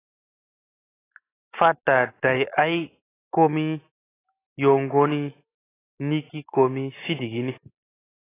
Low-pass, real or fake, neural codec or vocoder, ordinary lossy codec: 3.6 kHz; real; none; AAC, 16 kbps